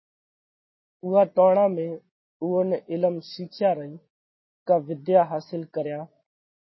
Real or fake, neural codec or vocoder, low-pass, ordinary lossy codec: real; none; 7.2 kHz; MP3, 24 kbps